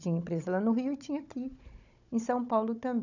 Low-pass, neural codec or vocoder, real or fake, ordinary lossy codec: 7.2 kHz; codec, 16 kHz, 16 kbps, FunCodec, trained on Chinese and English, 50 frames a second; fake; none